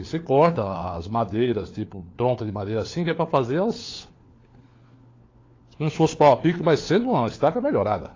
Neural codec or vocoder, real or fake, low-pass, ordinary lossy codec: codec, 16 kHz, 4 kbps, FunCodec, trained on LibriTTS, 50 frames a second; fake; 7.2 kHz; AAC, 32 kbps